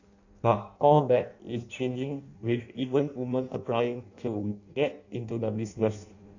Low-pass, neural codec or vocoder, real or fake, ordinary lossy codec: 7.2 kHz; codec, 16 kHz in and 24 kHz out, 0.6 kbps, FireRedTTS-2 codec; fake; none